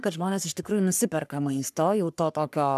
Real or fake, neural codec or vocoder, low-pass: fake; codec, 44.1 kHz, 3.4 kbps, Pupu-Codec; 14.4 kHz